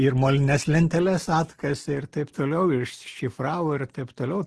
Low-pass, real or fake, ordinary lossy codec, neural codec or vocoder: 10.8 kHz; real; Opus, 16 kbps; none